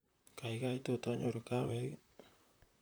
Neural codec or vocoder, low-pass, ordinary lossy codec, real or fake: vocoder, 44.1 kHz, 128 mel bands, Pupu-Vocoder; none; none; fake